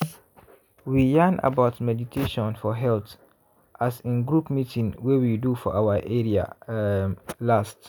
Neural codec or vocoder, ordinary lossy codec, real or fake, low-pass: none; none; real; none